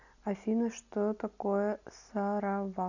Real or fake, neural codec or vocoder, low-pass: real; none; 7.2 kHz